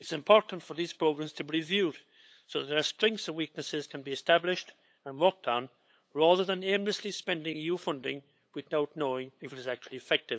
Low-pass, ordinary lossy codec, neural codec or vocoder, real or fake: none; none; codec, 16 kHz, 8 kbps, FunCodec, trained on LibriTTS, 25 frames a second; fake